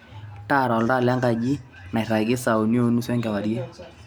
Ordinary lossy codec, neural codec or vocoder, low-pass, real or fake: none; none; none; real